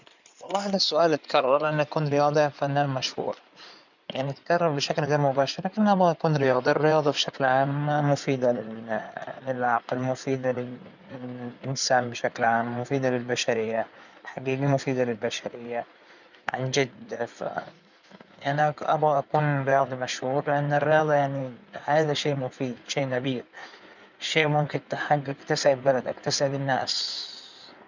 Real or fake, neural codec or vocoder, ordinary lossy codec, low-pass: fake; codec, 16 kHz in and 24 kHz out, 2.2 kbps, FireRedTTS-2 codec; none; 7.2 kHz